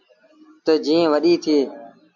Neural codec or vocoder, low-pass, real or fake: none; 7.2 kHz; real